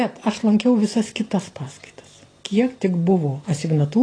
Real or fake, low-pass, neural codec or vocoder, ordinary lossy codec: real; 9.9 kHz; none; AAC, 32 kbps